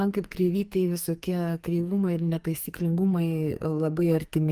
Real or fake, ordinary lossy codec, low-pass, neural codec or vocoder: fake; Opus, 32 kbps; 14.4 kHz; codec, 44.1 kHz, 2.6 kbps, SNAC